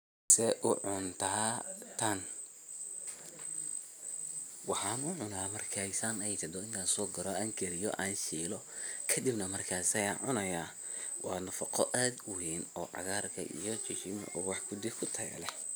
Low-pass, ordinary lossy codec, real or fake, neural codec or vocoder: none; none; real; none